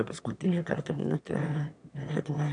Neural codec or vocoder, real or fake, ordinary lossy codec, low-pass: autoencoder, 22.05 kHz, a latent of 192 numbers a frame, VITS, trained on one speaker; fake; MP3, 96 kbps; 9.9 kHz